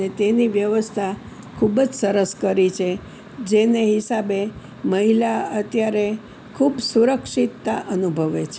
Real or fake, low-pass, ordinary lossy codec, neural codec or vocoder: real; none; none; none